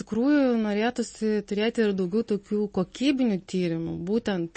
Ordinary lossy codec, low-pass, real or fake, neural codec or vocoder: MP3, 32 kbps; 10.8 kHz; real; none